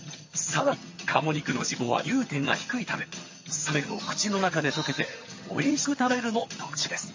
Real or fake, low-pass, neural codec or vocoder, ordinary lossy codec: fake; 7.2 kHz; vocoder, 22.05 kHz, 80 mel bands, HiFi-GAN; MP3, 32 kbps